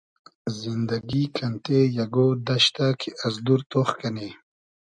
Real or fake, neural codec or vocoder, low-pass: real; none; 9.9 kHz